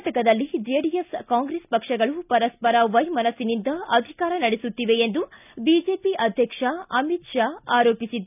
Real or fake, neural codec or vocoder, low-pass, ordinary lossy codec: real; none; 3.6 kHz; none